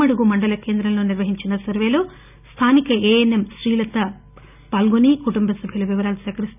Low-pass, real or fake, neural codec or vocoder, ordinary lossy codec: 3.6 kHz; real; none; none